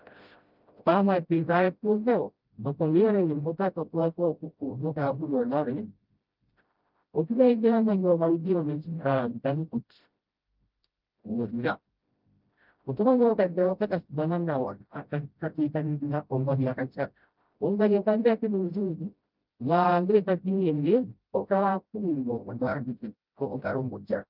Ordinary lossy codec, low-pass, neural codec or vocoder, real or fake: Opus, 32 kbps; 5.4 kHz; codec, 16 kHz, 0.5 kbps, FreqCodec, smaller model; fake